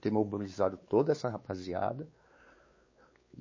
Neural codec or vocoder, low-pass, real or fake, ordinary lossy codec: codec, 16 kHz, 4 kbps, X-Codec, WavLM features, trained on Multilingual LibriSpeech; 7.2 kHz; fake; MP3, 32 kbps